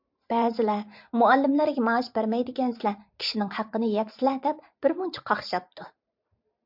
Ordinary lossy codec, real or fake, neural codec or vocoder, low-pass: MP3, 48 kbps; fake; vocoder, 44.1 kHz, 128 mel bands every 512 samples, BigVGAN v2; 5.4 kHz